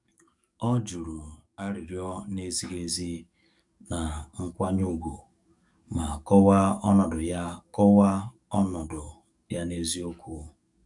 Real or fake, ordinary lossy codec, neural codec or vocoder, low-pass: fake; none; codec, 44.1 kHz, 7.8 kbps, DAC; 10.8 kHz